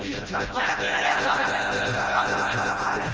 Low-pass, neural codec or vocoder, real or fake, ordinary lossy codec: 7.2 kHz; codec, 16 kHz, 0.5 kbps, FreqCodec, smaller model; fake; Opus, 24 kbps